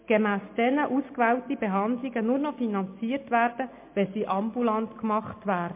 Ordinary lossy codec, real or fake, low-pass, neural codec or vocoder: MP3, 24 kbps; real; 3.6 kHz; none